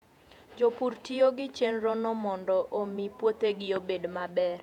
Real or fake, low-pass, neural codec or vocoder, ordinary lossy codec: fake; 19.8 kHz; vocoder, 48 kHz, 128 mel bands, Vocos; none